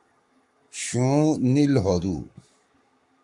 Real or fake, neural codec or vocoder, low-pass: fake; codec, 44.1 kHz, 7.8 kbps, Pupu-Codec; 10.8 kHz